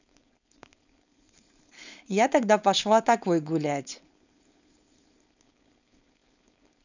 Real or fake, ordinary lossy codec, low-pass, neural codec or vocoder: fake; none; 7.2 kHz; codec, 16 kHz, 4.8 kbps, FACodec